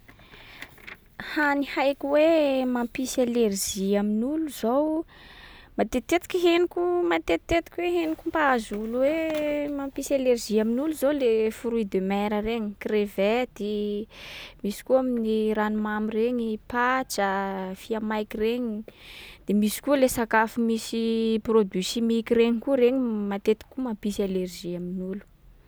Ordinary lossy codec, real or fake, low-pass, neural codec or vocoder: none; real; none; none